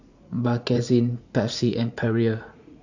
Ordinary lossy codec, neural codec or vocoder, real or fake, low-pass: AAC, 48 kbps; vocoder, 44.1 kHz, 80 mel bands, Vocos; fake; 7.2 kHz